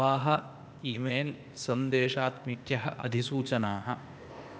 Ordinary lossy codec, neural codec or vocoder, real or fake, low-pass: none; codec, 16 kHz, 0.8 kbps, ZipCodec; fake; none